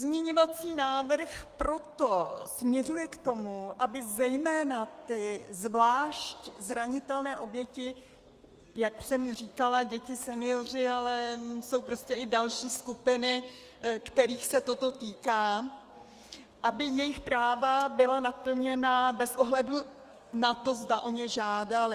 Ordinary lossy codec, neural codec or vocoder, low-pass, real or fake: Opus, 32 kbps; codec, 32 kHz, 1.9 kbps, SNAC; 14.4 kHz; fake